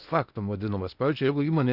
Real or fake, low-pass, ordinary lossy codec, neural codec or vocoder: fake; 5.4 kHz; Opus, 64 kbps; codec, 16 kHz in and 24 kHz out, 0.8 kbps, FocalCodec, streaming, 65536 codes